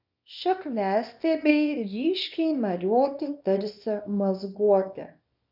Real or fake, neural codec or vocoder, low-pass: fake; codec, 24 kHz, 0.9 kbps, WavTokenizer, small release; 5.4 kHz